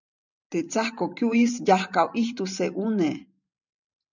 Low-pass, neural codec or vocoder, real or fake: 7.2 kHz; vocoder, 44.1 kHz, 128 mel bands every 512 samples, BigVGAN v2; fake